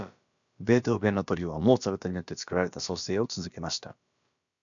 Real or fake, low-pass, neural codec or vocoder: fake; 7.2 kHz; codec, 16 kHz, about 1 kbps, DyCAST, with the encoder's durations